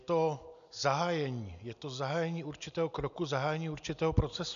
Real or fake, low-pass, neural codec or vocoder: real; 7.2 kHz; none